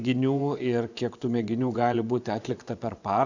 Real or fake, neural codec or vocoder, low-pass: fake; vocoder, 24 kHz, 100 mel bands, Vocos; 7.2 kHz